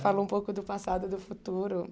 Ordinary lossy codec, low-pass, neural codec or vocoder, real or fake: none; none; none; real